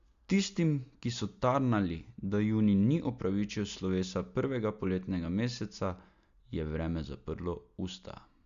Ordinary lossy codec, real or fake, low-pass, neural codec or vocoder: Opus, 64 kbps; real; 7.2 kHz; none